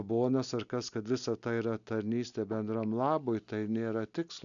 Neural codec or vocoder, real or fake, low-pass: none; real; 7.2 kHz